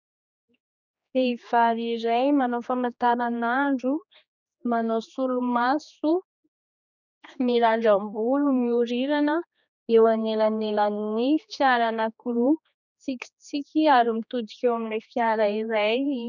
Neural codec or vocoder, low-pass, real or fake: codec, 16 kHz, 2 kbps, X-Codec, HuBERT features, trained on general audio; 7.2 kHz; fake